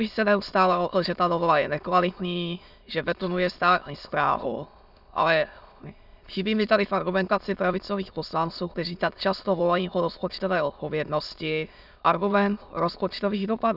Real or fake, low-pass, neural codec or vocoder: fake; 5.4 kHz; autoencoder, 22.05 kHz, a latent of 192 numbers a frame, VITS, trained on many speakers